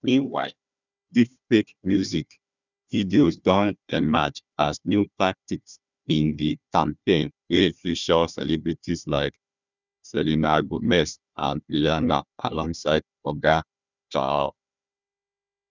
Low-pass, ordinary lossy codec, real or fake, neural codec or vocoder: 7.2 kHz; none; fake; codec, 16 kHz, 1 kbps, FunCodec, trained on Chinese and English, 50 frames a second